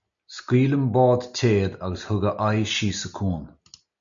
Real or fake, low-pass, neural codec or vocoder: real; 7.2 kHz; none